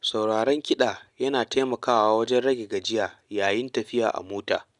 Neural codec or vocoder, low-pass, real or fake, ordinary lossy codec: none; 10.8 kHz; real; none